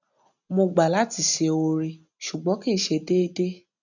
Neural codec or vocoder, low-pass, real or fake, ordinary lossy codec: none; 7.2 kHz; real; none